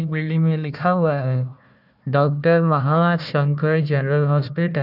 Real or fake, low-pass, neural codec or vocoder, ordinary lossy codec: fake; 5.4 kHz; codec, 16 kHz, 1 kbps, FunCodec, trained on Chinese and English, 50 frames a second; none